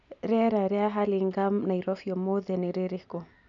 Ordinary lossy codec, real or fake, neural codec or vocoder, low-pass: none; real; none; 7.2 kHz